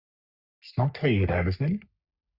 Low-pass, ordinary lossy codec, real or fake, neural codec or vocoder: 5.4 kHz; Opus, 64 kbps; fake; codec, 44.1 kHz, 3.4 kbps, Pupu-Codec